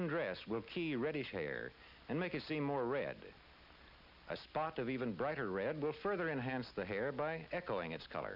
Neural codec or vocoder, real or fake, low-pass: none; real; 5.4 kHz